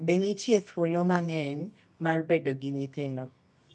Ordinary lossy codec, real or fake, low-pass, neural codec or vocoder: none; fake; none; codec, 24 kHz, 0.9 kbps, WavTokenizer, medium music audio release